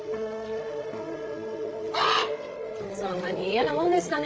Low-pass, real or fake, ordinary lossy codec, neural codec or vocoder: none; fake; none; codec, 16 kHz, 16 kbps, FreqCodec, larger model